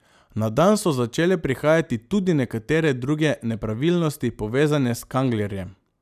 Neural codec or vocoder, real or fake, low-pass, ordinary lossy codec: none; real; 14.4 kHz; none